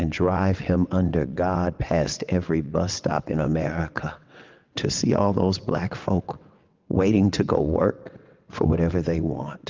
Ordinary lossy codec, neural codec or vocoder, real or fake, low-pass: Opus, 32 kbps; vocoder, 22.05 kHz, 80 mel bands, WaveNeXt; fake; 7.2 kHz